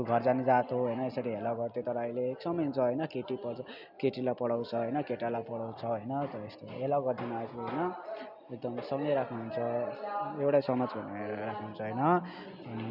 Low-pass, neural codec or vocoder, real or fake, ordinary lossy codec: 5.4 kHz; none; real; none